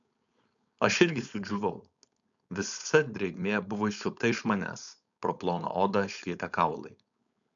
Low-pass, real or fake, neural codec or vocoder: 7.2 kHz; fake; codec, 16 kHz, 4.8 kbps, FACodec